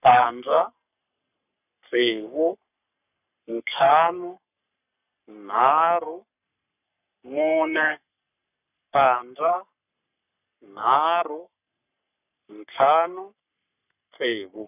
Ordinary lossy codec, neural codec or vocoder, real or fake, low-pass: none; codec, 44.1 kHz, 3.4 kbps, Pupu-Codec; fake; 3.6 kHz